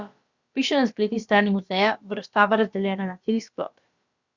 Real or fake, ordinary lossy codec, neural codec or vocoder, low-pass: fake; Opus, 64 kbps; codec, 16 kHz, about 1 kbps, DyCAST, with the encoder's durations; 7.2 kHz